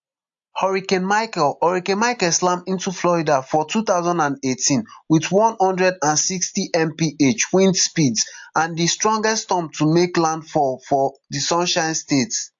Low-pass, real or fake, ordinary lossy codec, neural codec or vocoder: 7.2 kHz; real; AAC, 64 kbps; none